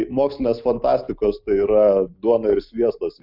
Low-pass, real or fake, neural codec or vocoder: 5.4 kHz; real; none